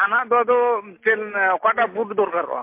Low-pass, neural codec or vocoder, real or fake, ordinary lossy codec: 3.6 kHz; none; real; MP3, 24 kbps